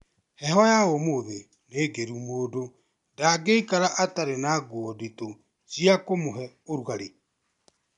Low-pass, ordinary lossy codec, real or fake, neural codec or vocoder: 10.8 kHz; MP3, 96 kbps; real; none